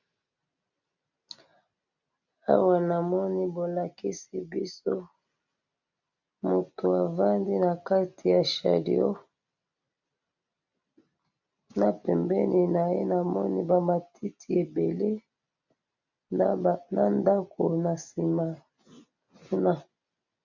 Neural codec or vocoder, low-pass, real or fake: none; 7.2 kHz; real